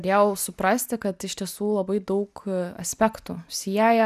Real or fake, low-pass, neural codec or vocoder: real; 14.4 kHz; none